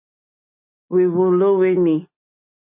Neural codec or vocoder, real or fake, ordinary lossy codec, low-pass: none; real; MP3, 32 kbps; 3.6 kHz